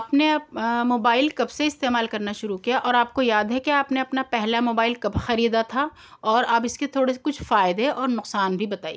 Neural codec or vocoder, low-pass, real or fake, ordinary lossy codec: none; none; real; none